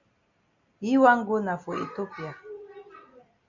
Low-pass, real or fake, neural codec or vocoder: 7.2 kHz; real; none